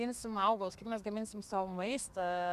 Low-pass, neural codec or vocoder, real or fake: 14.4 kHz; codec, 32 kHz, 1.9 kbps, SNAC; fake